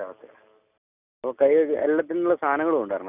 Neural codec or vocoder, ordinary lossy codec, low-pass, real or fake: none; none; 3.6 kHz; real